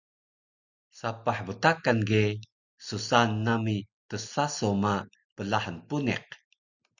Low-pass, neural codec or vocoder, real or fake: 7.2 kHz; none; real